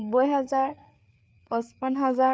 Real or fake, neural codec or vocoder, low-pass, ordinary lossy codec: fake; codec, 16 kHz, 4 kbps, FreqCodec, larger model; none; none